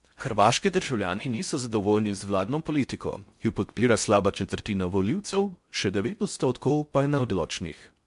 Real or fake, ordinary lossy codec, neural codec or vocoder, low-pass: fake; MP3, 64 kbps; codec, 16 kHz in and 24 kHz out, 0.6 kbps, FocalCodec, streaming, 4096 codes; 10.8 kHz